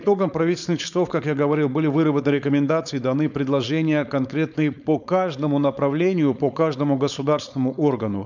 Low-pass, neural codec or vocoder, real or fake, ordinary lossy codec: 7.2 kHz; codec, 16 kHz, 4.8 kbps, FACodec; fake; none